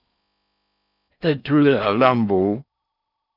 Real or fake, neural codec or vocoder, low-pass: fake; codec, 16 kHz in and 24 kHz out, 0.6 kbps, FocalCodec, streaming, 4096 codes; 5.4 kHz